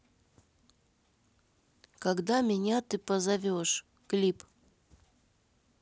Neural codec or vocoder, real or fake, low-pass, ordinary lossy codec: none; real; none; none